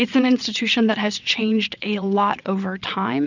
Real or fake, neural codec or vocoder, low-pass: fake; vocoder, 22.05 kHz, 80 mel bands, WaveNeXt; 7.2 kHz